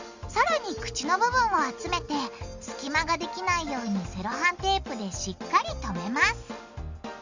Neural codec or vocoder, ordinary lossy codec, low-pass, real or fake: none; Opus, 64 kbps; 7.2 kHz; real